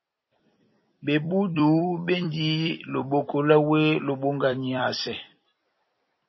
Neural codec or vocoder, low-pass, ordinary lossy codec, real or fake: vocoder, 44.1 kHz, 128 mel bands every 256 samples, BigVGAN v2; 7.2 kHz; MP3, 24 kbps; fake